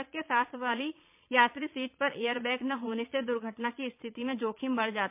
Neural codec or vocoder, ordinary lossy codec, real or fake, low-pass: vocoder, 22.05 kHz, 80 mel bands, Vocos; MP3, 32 kbps; fake; 3.6 kHz